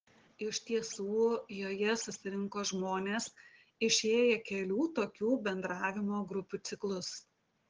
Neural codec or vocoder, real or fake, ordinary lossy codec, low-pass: none; real; Opus, 16 kbps; 7.2 kHz